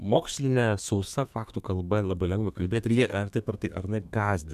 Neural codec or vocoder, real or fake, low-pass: codec, 32 kHz, 1.9 kbps, SNAC; fake; 14.4 kHz